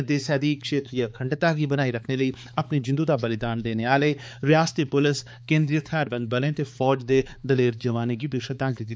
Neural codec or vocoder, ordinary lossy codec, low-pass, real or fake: codec, 16 kHz, 4 kbps, X-Codec, HuBERT features, trained on balanced general audio; none; none; fake